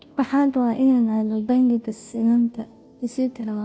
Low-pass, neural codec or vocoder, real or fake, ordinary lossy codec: none; codec, 16 kHz, 0.5 kbps, FunCodec, trained on Chinese and English, 25 frames a second; fake; none